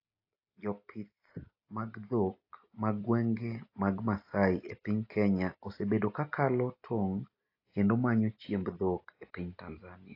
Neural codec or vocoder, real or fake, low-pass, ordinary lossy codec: none; real; 5.4 kHz; AAC, 32 kbps